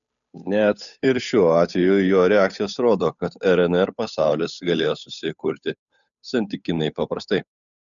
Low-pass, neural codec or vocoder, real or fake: 7.2 kHz; codec, 16 kHz, 8 kbps, FunCodec, trained on Chinese and English, 25 frames a second; fake